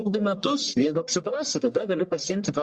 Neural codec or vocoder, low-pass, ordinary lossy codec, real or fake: codec, 44.1 kHz, 1.7 kbps, Pupu-Codec; 9.9 kHz; Opus, 64 kbps; fake